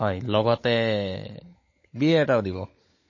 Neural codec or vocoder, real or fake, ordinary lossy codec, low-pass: codec, 16 kHz, 16 kbps, FunCodec, trained on LibriTTS, 50 frames a second; fake; MP3, 32 kbps; 7.2 kHz